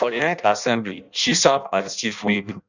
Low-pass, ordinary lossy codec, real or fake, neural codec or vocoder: 7.2 kHz; none; fake; codec, 16 kHz in and 24 kHz out, 0.6 kbps, FireRedTTS-2 codec